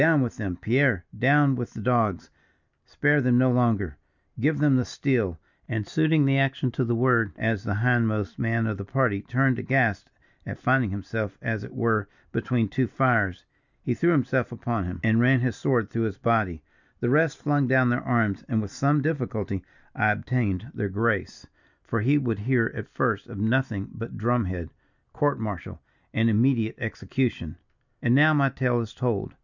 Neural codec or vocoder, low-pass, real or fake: none; 7.2 kHz; real